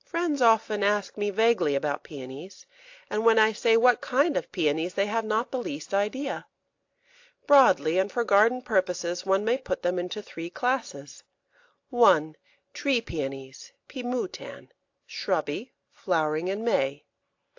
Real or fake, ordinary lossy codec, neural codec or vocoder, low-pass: real; AAC, 48 kbps; none; 7.2 kHz